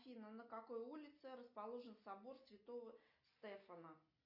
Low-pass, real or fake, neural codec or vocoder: 5.4 kHz; real; none